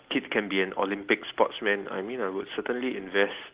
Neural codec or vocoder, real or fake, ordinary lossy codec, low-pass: none; real; Opus, 16 kbps; 3.6 kHz